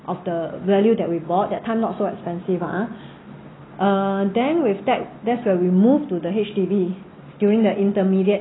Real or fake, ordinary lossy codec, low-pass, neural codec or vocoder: real; AAC, 16 kbps; 7.2 kHz; none